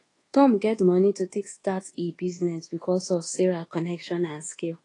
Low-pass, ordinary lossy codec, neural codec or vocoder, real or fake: 10.8 kHz; AAC, 32 kbps; codec, 24 kHz, 1.2 kbps, DualCodec; fake